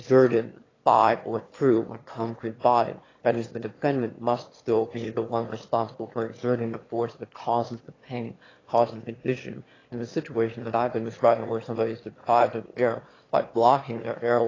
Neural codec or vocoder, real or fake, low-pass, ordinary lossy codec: autoencoder, 22.05 kHz, a latent of 192 numbers a frame, VITS, trained on one speaker; fake; 7.2 kHz; AAC, 32 kbps